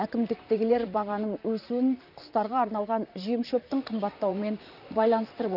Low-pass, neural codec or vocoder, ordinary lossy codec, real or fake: 5.4 kHz; vocoder, 44.1 kHz, 128 mel bands, Pupu-Vocoder; none; fake